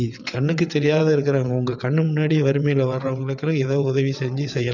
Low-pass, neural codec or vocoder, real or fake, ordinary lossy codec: 7.2 kHz; vocoder, 22.05 kHz, 80 mel bands, WaveNeXt; fake; none